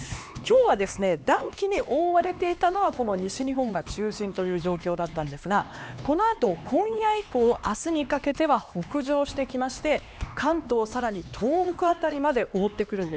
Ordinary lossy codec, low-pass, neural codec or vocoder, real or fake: none; none; codec, 16 kHz, 2 kbps, X-Codec, HuBERT features, trained on LibriSpeech; fake